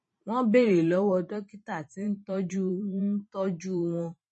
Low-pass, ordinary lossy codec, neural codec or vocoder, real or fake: 10.8 kHz; MP3, 32 kbps; vocoder, 44.1 kHz, 128 mel bands every 512 samples, BigVGAN v2; fake